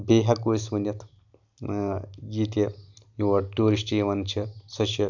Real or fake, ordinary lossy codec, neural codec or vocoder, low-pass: real; none; none; 7.2 kHz